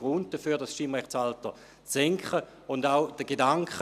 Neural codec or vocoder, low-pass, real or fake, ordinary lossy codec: none; 14.4 kHz; real; none